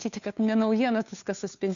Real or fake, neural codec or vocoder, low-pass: fake; codec, 16 kHz, 2 kbps, FunCodec, trained on Chinese and English, 25 frames a second; 7.2 kHz